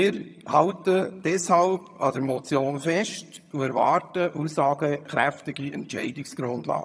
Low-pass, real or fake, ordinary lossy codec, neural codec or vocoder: none; fake; none; vocoder, 22.05 kHz, 80 mel bands, HiFi-GAN